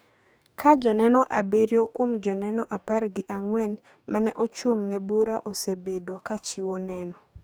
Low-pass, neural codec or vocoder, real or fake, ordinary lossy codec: none; codec, 44.1 kHz, 2.6 kbps, DAC; fake; none